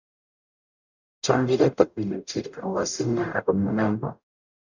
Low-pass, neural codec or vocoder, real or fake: 7.2 kHz; codec, 44.1 kHz, 0.9 kbps, DAC; fake